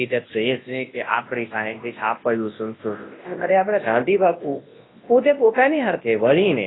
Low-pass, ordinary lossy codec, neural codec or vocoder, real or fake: 7.2 kHz; AAC, 16 kbps; codec, 24 kHz, 0.9 kbps, WavTokenizer, large speech release; fake